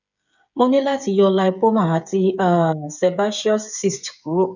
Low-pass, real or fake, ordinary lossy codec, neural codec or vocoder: 7.2 kHz; fake; none; codec, 16 kHz, 8 kbps, FreqCodec, smaller model